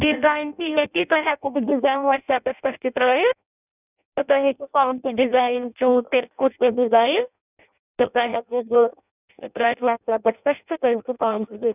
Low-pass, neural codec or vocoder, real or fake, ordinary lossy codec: 3.6 kHz; codec, 16 kHz in and 24 kHz out, 0.6 kbps, FireRedTTS-2 codec; fake; none